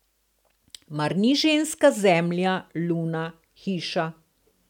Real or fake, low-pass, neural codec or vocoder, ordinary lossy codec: real; 19.8 kHz; none; none